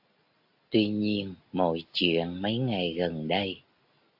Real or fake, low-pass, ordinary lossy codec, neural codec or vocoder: real; 5.4 kHz; AAC, 48 kbps; none